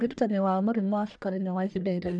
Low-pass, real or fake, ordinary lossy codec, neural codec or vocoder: 9.9 kHz; fake; none; codec, 44.1 kHz, 1.7 kbps, Pupu-Codec